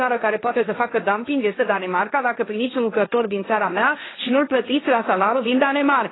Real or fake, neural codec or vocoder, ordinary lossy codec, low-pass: fake; codec, 16 kHz, 1.1 kbps, Voila-Tokenizer; AAC, 16 kbps; 7.2 kHz